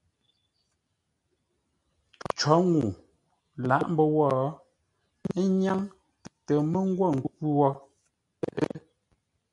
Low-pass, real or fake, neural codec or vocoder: 10.8 kHz; real; none